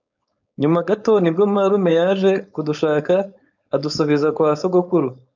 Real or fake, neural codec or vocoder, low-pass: fake; codec, 16 kHz, 4.8 kbps, FACodec; 7.2 kHz